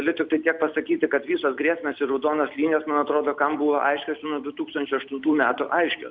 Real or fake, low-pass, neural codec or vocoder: real; 7.2 kHz; none